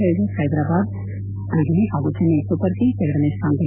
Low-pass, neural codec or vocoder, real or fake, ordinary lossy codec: 3.6 kHz; none; real; none